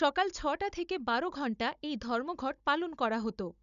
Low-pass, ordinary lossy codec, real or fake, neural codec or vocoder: 7.2 kHz; none; fake; codec, 16 kHz, 16 kbps, FunCodec, trained on Chinese and English, 50 frames a second